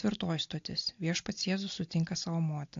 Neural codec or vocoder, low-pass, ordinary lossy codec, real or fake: none; 7.2 kHz; AAC, 48 kbps; real